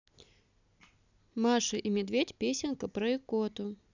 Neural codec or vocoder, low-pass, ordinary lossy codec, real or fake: none; 7.2 kHz; none; real